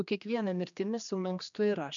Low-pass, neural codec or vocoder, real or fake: 7.2 kHz; codec, 16 kHz, 2 kbps, X-Codec, HuBERT features, trained on general audio; fake